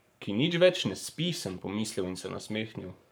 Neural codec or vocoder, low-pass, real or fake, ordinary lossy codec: codec, 44.1 kHz, 7.8 kbps, Pupu-Codec; none; fake; none